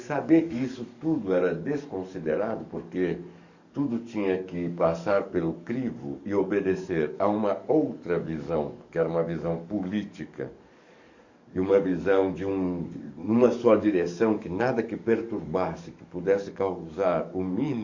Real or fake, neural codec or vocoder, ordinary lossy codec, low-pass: fake; codec, 44.1 kHz, 7.8 kbps, DAC; Opus, 64 kbps; 7.2 kHz